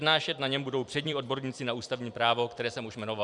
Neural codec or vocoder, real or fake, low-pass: vocoder, 44.1 kHz, 128 mel bands every 256 samples, BigVGAN v2; fake; 10.8 kHz